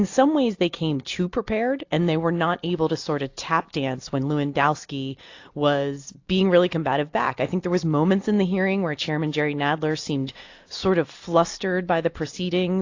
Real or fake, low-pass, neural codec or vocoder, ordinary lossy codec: real; 7.2 kHz; none; AAC, 48 kbps